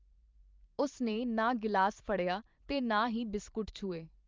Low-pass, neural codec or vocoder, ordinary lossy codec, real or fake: 7.2 kHz; vocoder, 44.1 kHz, 128 mel bands every 512 samples, BigVGAN v2; Opus, 32 kbps; fake